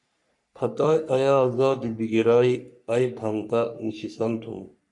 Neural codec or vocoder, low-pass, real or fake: codec, 44.1 kHz, 3.4 kbps, Pupu-Codec; 10.8 kHz; fake